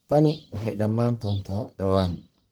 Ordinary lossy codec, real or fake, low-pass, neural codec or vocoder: none; fake; none; codec, 44.1 kHz, 1.7 kbps, Pupu-Codec